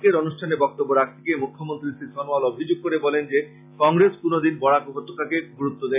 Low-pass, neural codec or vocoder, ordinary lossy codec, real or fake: 3.6 kHz; none; AAC, 32 kbps; real